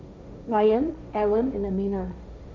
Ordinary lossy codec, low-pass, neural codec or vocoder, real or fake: none; none; codec, 16 kHz, 1.1 kbps, Voila-Tokenizer; fake